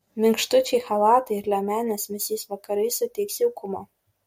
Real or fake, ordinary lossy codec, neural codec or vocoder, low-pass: real; MP3, 64 kbps; none; 19.8 kHz